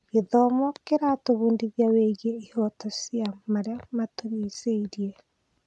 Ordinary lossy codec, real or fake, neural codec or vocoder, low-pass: none; real; none; none